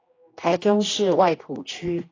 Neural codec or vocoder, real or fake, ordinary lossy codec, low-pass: codec, 16 kHz, 1 kbps, X-Codec, HuBERT features, trained on general audio; fake; AAC, 32 kbps; 7.2 kHz